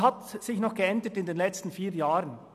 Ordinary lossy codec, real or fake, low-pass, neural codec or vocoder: none; real; 14.4 kHz; none